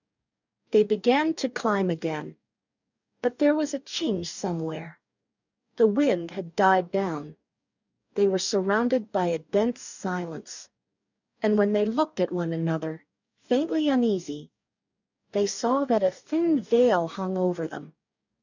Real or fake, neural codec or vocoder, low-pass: fake; codec, 44.1 kHz, 2.6 kbps, DAC; 7.2 kHz